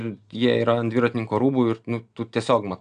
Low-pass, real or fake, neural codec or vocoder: 9.9 kHz; real; none